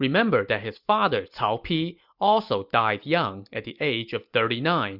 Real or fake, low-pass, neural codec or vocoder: real; 5.4 kHz; none